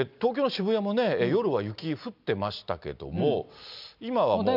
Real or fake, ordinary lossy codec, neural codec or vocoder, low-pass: real; none; none; 5.4 kHz